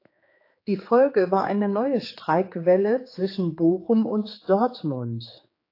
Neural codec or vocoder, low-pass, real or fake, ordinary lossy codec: codec, 16 kHz, 4 kbps, X-Codec, HuBERT features, trained on general audio; 5.4 kHz; fake; AAC, 32 kbps